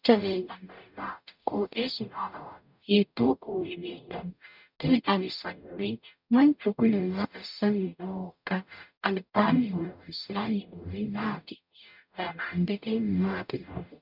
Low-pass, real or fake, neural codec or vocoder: 5.4 kHz; fake; codec, 44.1 kHz, 0.9 kbps, DAC